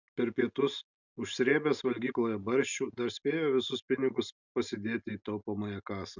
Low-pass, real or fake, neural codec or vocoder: 7.2 kHz; real; none